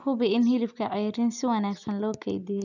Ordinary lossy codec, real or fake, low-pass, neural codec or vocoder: none; real; 7.2 kHz; none